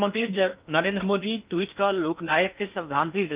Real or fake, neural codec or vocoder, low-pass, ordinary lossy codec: fake; codec, 16 kHz in and 24 kHz out, 0.8 kbps, FocalCodec, streaming, 65536 codes; 3.6 kHz; Opus, 24 kbps